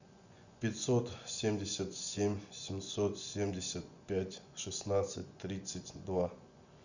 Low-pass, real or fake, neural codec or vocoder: 7.2 kHz; fake; vocoder, 24 kHz, 100 mel bands, Vocos